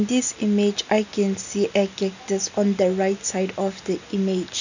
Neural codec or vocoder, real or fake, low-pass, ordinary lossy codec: none; real; 7.2 kHz; none